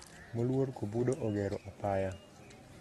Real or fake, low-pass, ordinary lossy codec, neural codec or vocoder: real; 19.8 kHz; AAC, 32 kbps; none